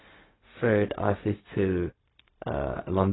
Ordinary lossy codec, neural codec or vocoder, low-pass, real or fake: AAC, 16 kbps; codec, 16 kHz, 0.4 kbps, LongCat-Audio-Codec; 7.2 kHz; fake